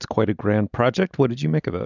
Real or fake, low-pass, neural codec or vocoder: real; 7.2 kHz; none